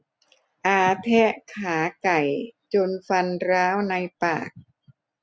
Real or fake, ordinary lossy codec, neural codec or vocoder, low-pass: real; none; none; none